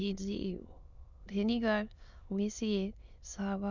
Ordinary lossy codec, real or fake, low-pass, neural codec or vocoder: none; fake; 7.2 kHz; autoencoder, 22.05 kHz, a latent of 192 numbers a frame, VITS, trained on many speakers